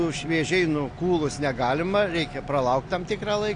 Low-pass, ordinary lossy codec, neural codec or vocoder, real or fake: 9.9 kHz; AAC, 48 kbps; none; real